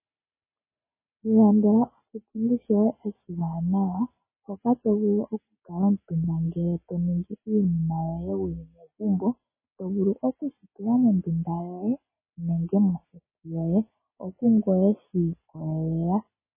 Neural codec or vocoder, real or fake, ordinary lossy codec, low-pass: none; real; MP3, 16 kbps; 3.6 kHz